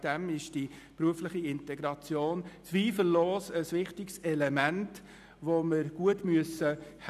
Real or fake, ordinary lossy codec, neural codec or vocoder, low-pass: real; none; none; 14.4 kHz